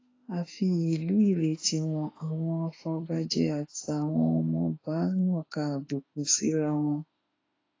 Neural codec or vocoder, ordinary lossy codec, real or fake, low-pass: autoencoder, 48 kHz, 32 numbers a frame, DAC-VAE, trained on Japanese speech; AAC, 32 kbps; fake; 7.2 kHz